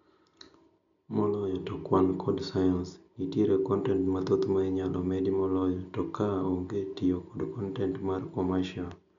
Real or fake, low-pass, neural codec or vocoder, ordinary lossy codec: real; 7.2 kHz; none; none